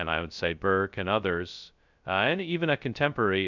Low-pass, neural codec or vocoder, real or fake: 7.2 kHz; codec, 16 kHz, 0.2 kbps, FocalCodec; fake